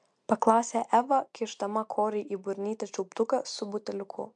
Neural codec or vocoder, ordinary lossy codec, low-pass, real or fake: none; MP3, 64 kbps; 9.9 kHz; real